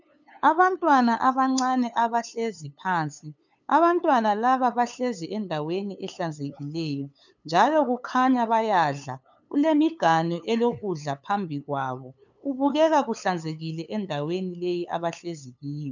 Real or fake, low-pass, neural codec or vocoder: fake; 7.2 kHz; codec, 16 kHz, 8 kbps, FunCodec, trained on LibriTTS, 25 frames a second